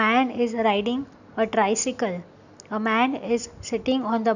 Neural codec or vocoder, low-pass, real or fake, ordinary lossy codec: none; 7.2 kHz; real; none